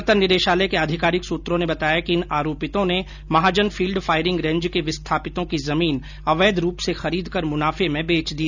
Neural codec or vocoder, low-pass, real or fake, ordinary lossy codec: none; 7.2 kHz; real; none